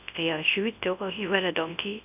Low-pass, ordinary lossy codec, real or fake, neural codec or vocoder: 3.6 kHz; none; fake; codec, 24 kHz, 0.9 kbps, WavTokenizer, large speech release